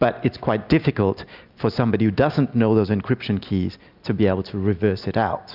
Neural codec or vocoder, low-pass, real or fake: none; 5.4 kHz; real